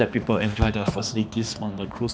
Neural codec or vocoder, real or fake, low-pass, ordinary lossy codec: codec, 16 kHz, 2 kbps, X-Codec, HuBERT features, trained on balanced general audio; fake; none; none